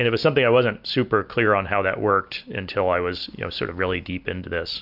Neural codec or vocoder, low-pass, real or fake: none; 5.4 kHz; real